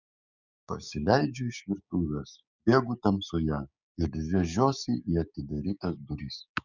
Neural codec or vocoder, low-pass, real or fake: codec, 16 kHz, 6 kbps, DAC; 7.2 kHz; fake